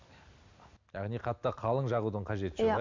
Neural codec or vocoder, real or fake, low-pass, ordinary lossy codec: none; real; 7.2 kHz; MP3, 64 kbps